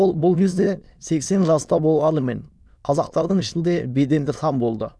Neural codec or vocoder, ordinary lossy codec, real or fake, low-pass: autoencoder, 22.05 kHz, a latent of 192 numbers a frame, VITS, trained on many speakers; none; fake; none